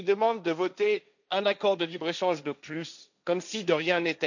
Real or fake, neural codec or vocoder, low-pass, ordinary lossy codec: fake; codec, 16 kHz, 1.1 kbps, Voila-Tokenizer; 7.2 kHz; none